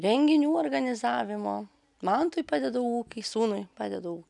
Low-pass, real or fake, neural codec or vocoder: 10.8 kHz; real; none